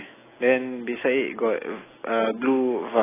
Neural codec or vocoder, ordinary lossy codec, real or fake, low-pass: none; AAC, 16 kbps; real; 3.6 kHz